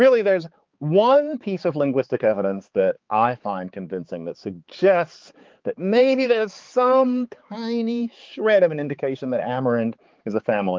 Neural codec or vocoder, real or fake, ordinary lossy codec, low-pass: codec, 16 kHz, 4 kbps, X-Codec, HuBERT features, trained on balanced general audio; fake; Opus, 32 kbps; 7.2 kHz